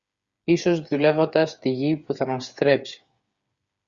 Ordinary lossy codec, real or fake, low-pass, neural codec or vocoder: Opus, 64 kbps; fake; 7.2 kHz; codec, 16 kHz, 8 kbps, FreqCodec, smaller model